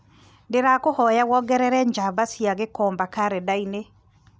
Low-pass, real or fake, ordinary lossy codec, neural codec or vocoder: none; real; none; none